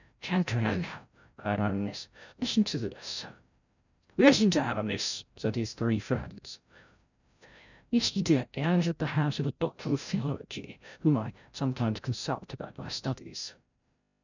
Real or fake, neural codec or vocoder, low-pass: fake; codec, 16 kHz, 0.5 kbps, FreqCodec, larger model; 7.2 kHz